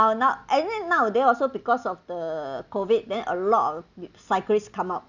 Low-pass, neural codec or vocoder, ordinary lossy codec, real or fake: 7.2 kHz; none; none; real